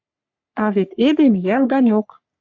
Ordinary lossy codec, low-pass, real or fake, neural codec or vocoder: AAC, 48 kbps; 7.2 kHz; fake; codec, 44.1 kHz, 3.4 kbps, Pupu-Codec